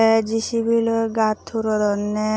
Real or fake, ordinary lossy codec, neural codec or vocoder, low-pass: real; none; none; none